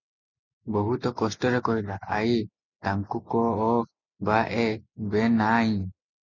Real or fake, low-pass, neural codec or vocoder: real; 7.2 kHz; none